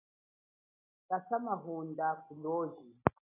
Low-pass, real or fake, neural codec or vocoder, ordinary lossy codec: 3.6 kHz; real; none; AAC, 16 kbps